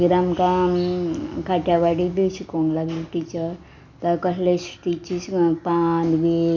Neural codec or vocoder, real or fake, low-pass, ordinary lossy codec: none; real; 7.2 kHz; none